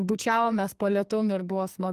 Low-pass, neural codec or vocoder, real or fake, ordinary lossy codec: 14.4 kHz; codec, 44.1 kHz, 2.6 kbps, SNAC; fake; Opus, 24 kbps